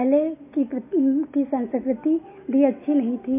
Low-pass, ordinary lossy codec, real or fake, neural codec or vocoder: 3.6 kHz; MP3, 24 kbps; real; none